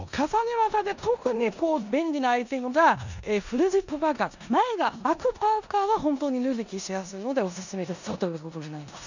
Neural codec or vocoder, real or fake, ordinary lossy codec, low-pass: codec, 16 kHz in and 24 kHz out, 0.9 kbps, LongCat-Audio-Codec, four codebook decoder; fake; none; 7.2 kHz